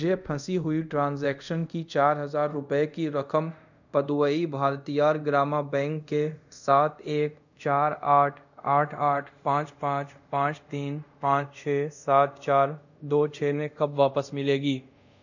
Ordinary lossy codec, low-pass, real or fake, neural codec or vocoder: none; 7.2 kHz; fake; codec, 24 kHz, 0.5 kbps, DualCodec